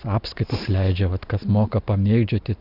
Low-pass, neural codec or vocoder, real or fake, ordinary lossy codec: 5.4 kHz; none; real; Opus, 64 kbps